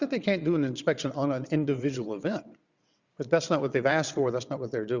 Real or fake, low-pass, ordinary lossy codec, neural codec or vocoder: fake; 7.2 kHz; Opus, 64 kbps; vocoder, 22.05 kHz, 80 mel bands, WaveNeXt